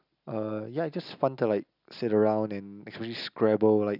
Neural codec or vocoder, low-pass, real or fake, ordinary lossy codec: none; 5.4 kHz; real; none